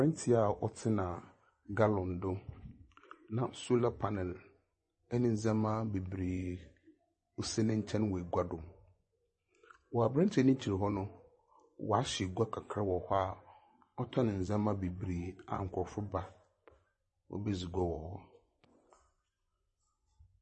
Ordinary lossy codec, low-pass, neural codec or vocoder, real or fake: MP3, 32 kbps; 10.8 kHz; vocoder, 24 kHz, 100 mel bands, Vocos; fake